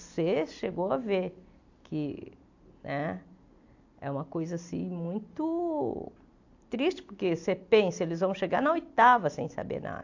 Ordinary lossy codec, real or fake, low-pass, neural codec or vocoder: AAC, 48 kbps; real; 7.2 kHz; none